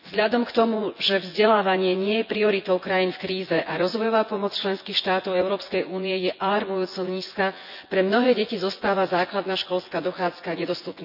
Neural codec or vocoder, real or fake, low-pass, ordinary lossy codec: vocoder, 24 kHz, 100 mel bands, Vocos; fake; 5.4 kHz; none